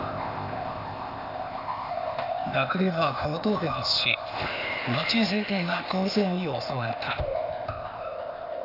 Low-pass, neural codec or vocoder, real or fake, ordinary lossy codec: 5.4 kHz; codec, 16 kHz, 0.8 kbps, ZipCodec; fake; none